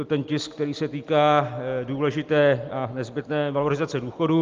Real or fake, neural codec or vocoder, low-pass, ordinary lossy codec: real; none; 7.2 kHz; Opus, 32 kbps